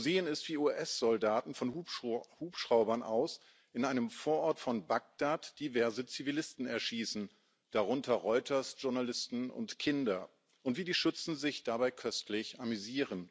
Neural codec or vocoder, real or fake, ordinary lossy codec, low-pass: none; real; none; none